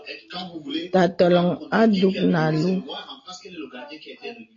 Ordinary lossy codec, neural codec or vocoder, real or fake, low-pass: AAC, 32 kbps; none; real; 7.2 kHz